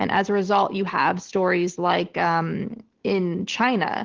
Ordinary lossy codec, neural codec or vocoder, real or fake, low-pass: Opus, 16 kbps; none; real; 7.2 kHz